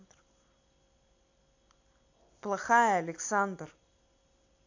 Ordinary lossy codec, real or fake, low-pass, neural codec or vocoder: AAC, 48 kbps; real; 7.2 kHz; none